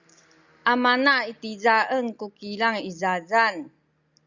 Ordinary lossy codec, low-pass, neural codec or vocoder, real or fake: Opus, 64 kbps; 7.2 kHz; none; real